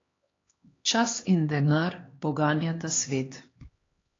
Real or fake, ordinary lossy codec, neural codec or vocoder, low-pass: fake; AAC, 32 kbps; codec, 16 kHz, 2 kbps, X-Codec, HuBERT features, trained on LibriSpeech; 7.2 kHz